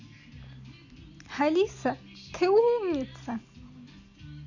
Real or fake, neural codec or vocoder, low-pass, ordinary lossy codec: real; none; 7.2 kHz; none